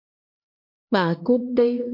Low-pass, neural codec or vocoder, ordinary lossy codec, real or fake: 5.4 kHz; codec, 16 kHz, 2 kbps, X-Codec, HuBERT features, trained on LibriSpeech; MP3, 32 kbps; fake